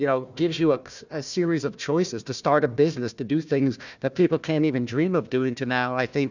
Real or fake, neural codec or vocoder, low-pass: fake; codec, 16 kHz, 1 kbps, FunCodec, trained on Chinese and English, 50 frames a second; 7.2 kHz